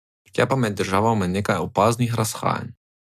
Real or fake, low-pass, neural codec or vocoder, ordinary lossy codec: real; 14.4 kHz; none; MP3, 96 kbps